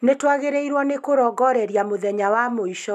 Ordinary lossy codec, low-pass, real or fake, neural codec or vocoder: none; 14.4 kHz; real; none